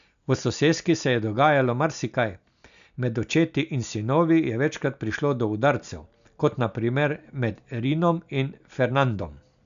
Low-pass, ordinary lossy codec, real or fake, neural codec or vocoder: 7.2 kHz; none; real; none